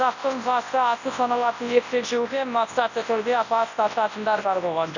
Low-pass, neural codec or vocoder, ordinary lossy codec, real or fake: 7.2 kHz; codec, 24 kHz, 0.9 kbps, WavTokenizer, large speech release; none; fake